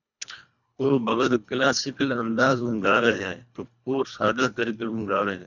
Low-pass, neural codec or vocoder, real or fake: 7.2 kHz; codec, 24 kHz, 1.5 kbps, HILCodec; fake